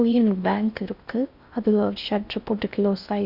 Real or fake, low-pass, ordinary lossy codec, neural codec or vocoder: fake; 5.4 kHz; Opus, 64 kbps; codec, 16 kHz in and 24 kHz out, 0.6 kbps, FocalCodec, streaming, 4096 codes